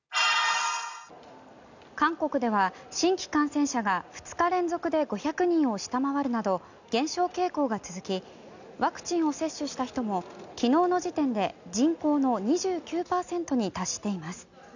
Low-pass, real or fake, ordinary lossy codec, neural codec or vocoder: 7.2 kHz; real; none; none